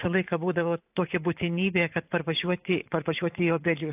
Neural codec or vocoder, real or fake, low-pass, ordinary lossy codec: vocoder, 44.1 kHz, 128 mel bands every 512 samples, BigVGAN v2; fake; 3.6 kHz; AAC, 32 kbps